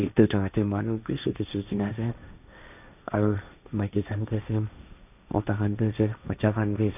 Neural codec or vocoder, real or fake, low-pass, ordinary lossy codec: codec, 16 kHz, 1.1 kbps, Voila-Tokenizer; fake; 3.6 kHz; none